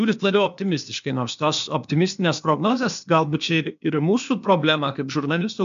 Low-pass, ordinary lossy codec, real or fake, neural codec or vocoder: 7.2 kHz; MP3, 64 kbps; fake; codec, 16 kHz, 0.8 kbps, ZipCodec